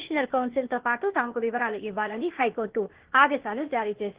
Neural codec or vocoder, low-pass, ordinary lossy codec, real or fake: codec, 16 kHz, 0.8 kbps, ZipCodec; 3.6 kHz; Opus, 16 kbps; fake